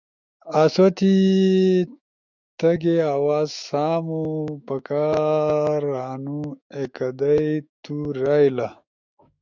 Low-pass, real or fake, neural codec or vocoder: 7.2 kHz; fake; autoencoder, 48 kHz, 128 numbers a frame, DAC-VAE, trained on Japanese speech